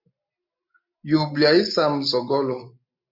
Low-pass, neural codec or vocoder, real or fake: 5.4 kHz; none; real